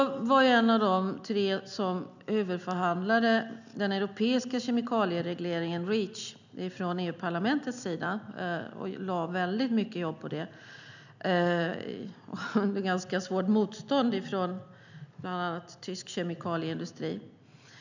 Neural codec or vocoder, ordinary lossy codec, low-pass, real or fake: none; none; 7.2 kHz; real